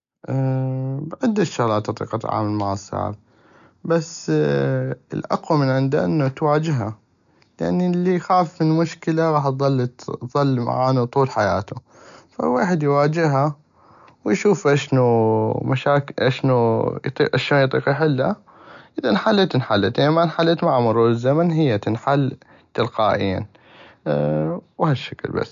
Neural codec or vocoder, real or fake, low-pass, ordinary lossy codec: none; real; 7.2 kHz; AAC, 96 kbps